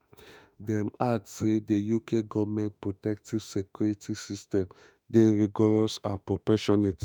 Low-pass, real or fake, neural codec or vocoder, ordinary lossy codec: none; fake; autoencoder, 48 kHz, 32 numbers a frame, DAC-VAE, trained on Japanese speech; none